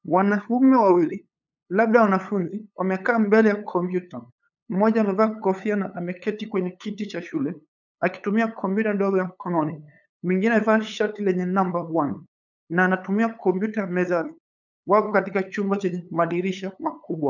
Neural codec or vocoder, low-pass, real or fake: codec, 16 kHz, 8 kbps, FunCodec, trained on LibriTTS, 25 frames a second; 7.2 kHz; fake